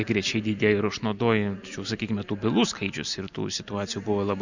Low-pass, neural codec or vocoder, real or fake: 7.2 kHz; none; real